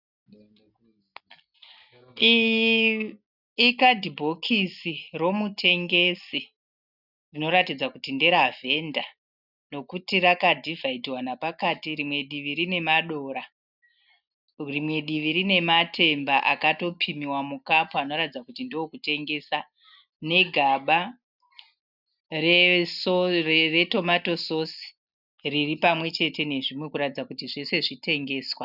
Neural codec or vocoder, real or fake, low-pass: none; real; 5.4 kHz